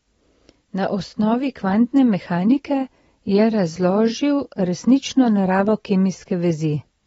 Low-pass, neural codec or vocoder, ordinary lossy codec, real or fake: 19.8 kHz; none; AAC, 24 kbps; real